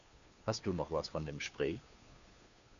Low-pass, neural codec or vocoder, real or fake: 7.2 kHz; codec, 16 kHz, 2 kbps, FunCodec, trained on Chinese and English, 25 frames a second; fake